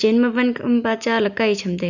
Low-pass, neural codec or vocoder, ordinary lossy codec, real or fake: 7.2 kHz; none; none; real